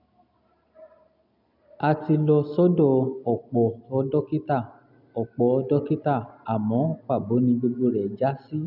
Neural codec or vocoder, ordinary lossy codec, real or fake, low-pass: none; none; real; 5.4 kHz